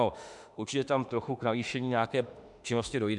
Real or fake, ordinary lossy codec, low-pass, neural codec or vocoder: fake; MP3, 96 kbps; 10.8 kHz; autoencoder, 48 kHz, 32 numbers a frame, DAC-VAE, trained on Japanese speech